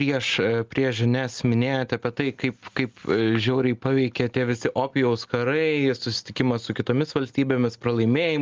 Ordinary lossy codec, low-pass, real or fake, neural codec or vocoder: Opus, 32 kbps; 7.2 kHz; real; none